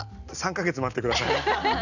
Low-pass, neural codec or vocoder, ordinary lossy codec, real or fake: 7.2 kHz; none; none; real